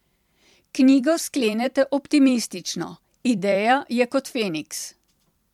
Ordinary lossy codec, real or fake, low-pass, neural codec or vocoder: MP3, 96 kbps; fake; 19.8 kHz; vocoder, 44.1 kHz, 128 mel bands every 256 samples, BigVGAN v2